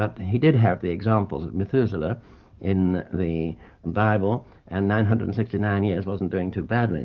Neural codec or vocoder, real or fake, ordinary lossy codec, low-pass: codec, 44.1 kHz, 7.8 kbps, DAC; fake; Opus, 32 kbps; 7.2 kHz